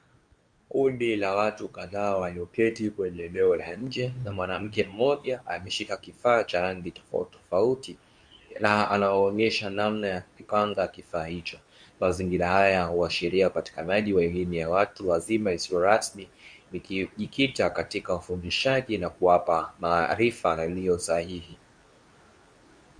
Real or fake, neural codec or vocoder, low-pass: fake; codec, 24 kHz, 0.9 kbps, WavTokenizer, medium speech release version 2; 9.9 kHz